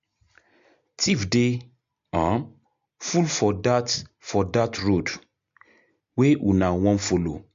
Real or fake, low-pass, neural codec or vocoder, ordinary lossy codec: real; 7.2 kHz; none; MP3, 48 kbps